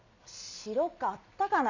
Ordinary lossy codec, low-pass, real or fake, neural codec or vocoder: none; 7.2 kHz; real; none